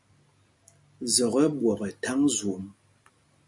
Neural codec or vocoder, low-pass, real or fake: none; 10.8 kHz; real